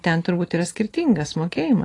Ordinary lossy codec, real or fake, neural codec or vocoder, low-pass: AAC, 32 kbps; real; none; 10.8 kHz